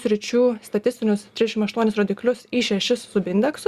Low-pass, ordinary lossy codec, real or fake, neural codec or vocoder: 14.4 kHz; Opus, 64 kbps; real; none